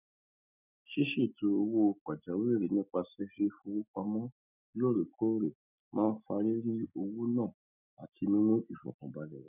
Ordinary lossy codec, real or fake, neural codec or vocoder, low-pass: none; real; none; 3.6 kHz